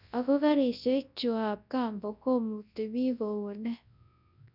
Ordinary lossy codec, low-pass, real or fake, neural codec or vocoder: none; 5.4 kHz; fake; codec, 24 kHz, 0.9 kbps, WavTokenizer, large speech release